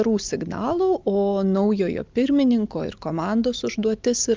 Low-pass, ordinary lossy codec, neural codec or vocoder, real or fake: 7.2 kHz; Opus, 24 kbps; none; real